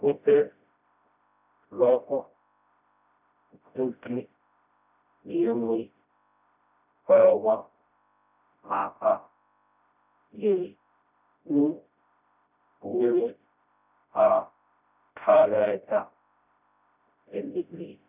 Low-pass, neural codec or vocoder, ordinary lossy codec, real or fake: 3.6 kHz; codec, 16 kHz, 0.5 kbps, FreqCodec, smaller model; none; fake